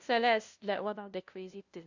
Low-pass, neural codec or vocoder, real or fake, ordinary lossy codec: 7.2 kHz; codec, 16 kHz, 0.5 kbps, FunCodec, trained on LibriTTS, 25 frames a second; fake; Opus, 64 kbps